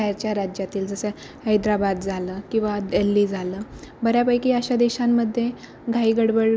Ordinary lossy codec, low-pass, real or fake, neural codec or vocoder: none; none; real; none